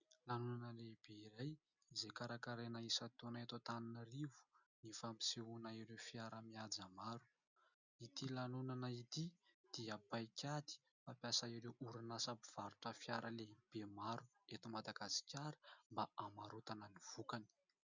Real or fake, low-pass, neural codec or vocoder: real; 7.2 kHz; none